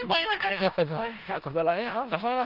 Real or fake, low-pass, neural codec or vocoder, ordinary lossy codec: fake; 5.4 kHz; codec, 16 kHz in and 24 kHz out, 0.4 kbps, LongCat-Audio-Codec, four codebook decoder; Opus, 32 kbps